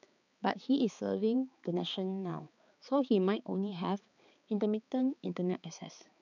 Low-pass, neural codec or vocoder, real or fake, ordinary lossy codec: 7.2 kHz; codec, 16 kHz, 4 kbps, X-Codec, HuBERT features, trained on balanced general audio; fake; none